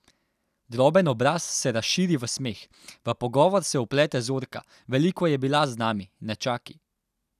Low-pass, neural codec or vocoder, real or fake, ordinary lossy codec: 14.4 kHz; none; real; none